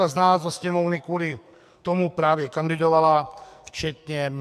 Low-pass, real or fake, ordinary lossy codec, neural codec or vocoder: 14.4 kHz; fake; MP3, 96 kbps; codec, 44.1 kHz, 2.6 kbps, SNAC